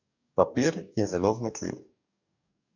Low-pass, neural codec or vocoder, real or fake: 7.2 kHz; codec, 44.1 kHz, 2.6 kbps, DAC; fake